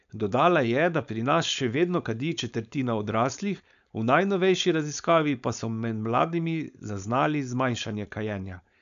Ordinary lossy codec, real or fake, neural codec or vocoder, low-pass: none; fake; codec, 16 kHz, 4.8 kbps, FACodec; 7.2 kHz